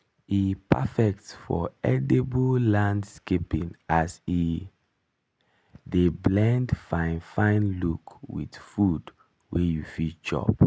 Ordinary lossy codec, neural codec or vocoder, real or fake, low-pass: none; none; real; none